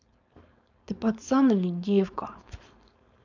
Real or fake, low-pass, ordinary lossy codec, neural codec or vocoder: fake; 7.2 kHz; none; codec, 16 kHz, 4.8 kbps, FACodec